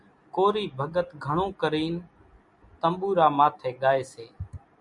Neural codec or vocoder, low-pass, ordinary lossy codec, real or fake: none; 10.8 kHz; MP3, 96 kbps; real